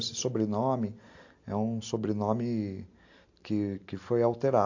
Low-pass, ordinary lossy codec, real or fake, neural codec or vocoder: 7.2 kHz; AAC, 48 kbps; real; none